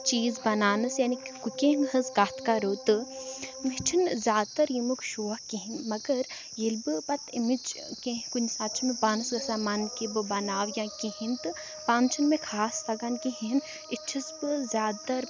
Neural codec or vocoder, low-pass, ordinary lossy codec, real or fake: none; none; none; real